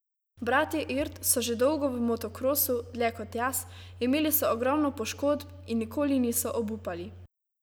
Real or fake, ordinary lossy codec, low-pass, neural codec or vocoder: real; none; none; none